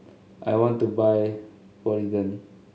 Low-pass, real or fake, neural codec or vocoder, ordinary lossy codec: none; real; none; none